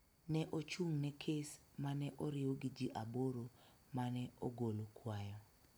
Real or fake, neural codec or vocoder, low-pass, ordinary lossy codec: real; none; none; none